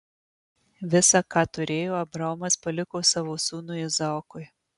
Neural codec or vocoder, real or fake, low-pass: none; real; 10.8 kHz